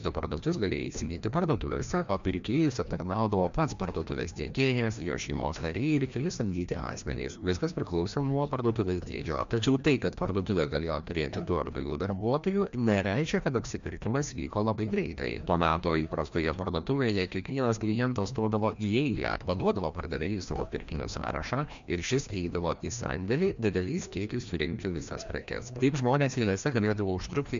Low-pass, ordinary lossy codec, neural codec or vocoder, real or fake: 7.2 kHz; MP3, 64 kbps; codec, 16 kHz, 1 kbps, FreqCodec, larger model; fake